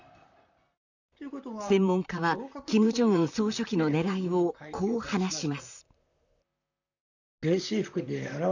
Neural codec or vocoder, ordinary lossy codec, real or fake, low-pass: vocoder, 44.1 kHz, 128 mel bands, Pupu-Vocoder; none; fake; 7.2 kHz